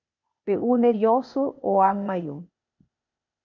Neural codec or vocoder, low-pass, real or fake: codec, 16 kHz, 0.8 kbps, ZipCodec; 7.2 kHz; fake